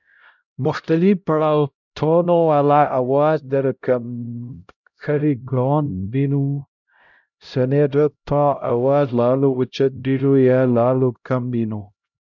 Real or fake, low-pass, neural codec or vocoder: fake; 7.2 kHz; codec, 16 kHz, 0.5 kbps, X-Codec, HuBERT features, trained on LibriSpeech